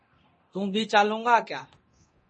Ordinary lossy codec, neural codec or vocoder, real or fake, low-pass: MP3, 32 kbps; codec, 24 kHz, 0.9 kbps, DualCodec; fake; 10.8 kHz